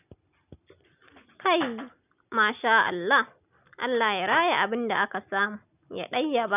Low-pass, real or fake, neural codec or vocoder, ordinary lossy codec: 3.6 kHz; real; none; none